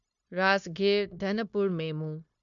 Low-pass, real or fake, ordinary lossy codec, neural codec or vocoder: 7.2 kHz; fake; MP3, 64 kbps; codec, 16 kHz, 0.9 kbps, LongCat-Audio-Codec